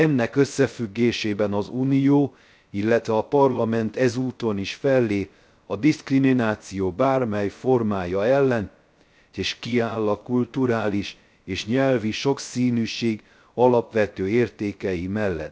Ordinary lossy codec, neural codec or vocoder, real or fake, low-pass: none; codec, 16 kHz, 0.3 kbps, FocalCodec; fake; none